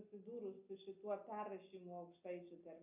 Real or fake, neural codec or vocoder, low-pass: real; none; 3.6 kHz